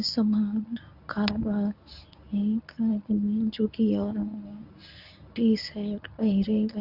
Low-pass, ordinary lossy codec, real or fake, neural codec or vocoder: 5.4 kHz; none; fake; codec, 24 kHz, 0.9 kbps, WavTokenizer, medium speech release version 1